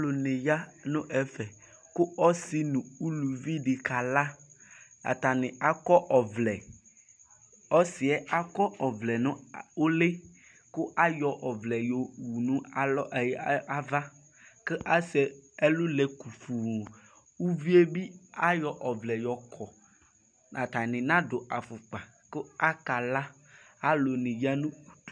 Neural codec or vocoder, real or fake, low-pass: none; real; 9.9 kHz